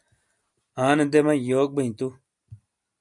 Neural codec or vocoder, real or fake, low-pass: none; real; 10.8 kHz